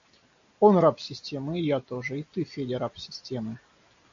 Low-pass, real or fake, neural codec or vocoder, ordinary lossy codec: 7.2 kHz; real; none; AAC, 64 kbps